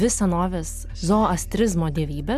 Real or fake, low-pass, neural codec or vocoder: real; 14.4 kHz; none